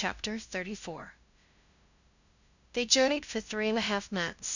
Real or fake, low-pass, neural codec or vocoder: fake; 7.2 kHz; codec, 16 kHz, 0.5 kbps, FunCodec, trained on LibriTTS, 25 frames a second